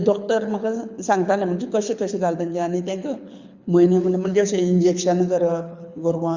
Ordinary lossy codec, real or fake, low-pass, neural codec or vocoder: Opus, 64 kbps; fake; 7.2 kHz; codec, 24 kHz, 6 kbps, HILCodec